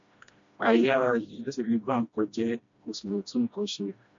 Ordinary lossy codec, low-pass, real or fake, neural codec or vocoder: MP3, 48 kbps; 7.2 kHz; fake; codec, 16 kHz, 1 kbps, FreqCodec, smaller model